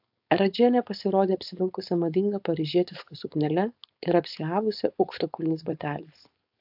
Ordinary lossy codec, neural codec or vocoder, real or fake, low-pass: AAC, 48 kbps; codec, 16 kHz, 4.8 kbps, FACodec; fake; 5.4 kHz